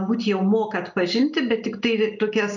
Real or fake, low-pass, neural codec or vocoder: real; 7.2 kHz; none